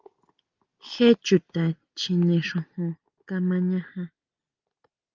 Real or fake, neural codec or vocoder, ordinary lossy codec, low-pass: real; none; Opus, 24 kbps; 7.2 kHz